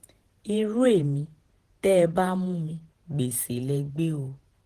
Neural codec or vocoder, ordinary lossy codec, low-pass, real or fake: vocoder, 48 kHz, 128 mel bands, Vocos; Opus, 16 kbps; 14.4 kHz; fake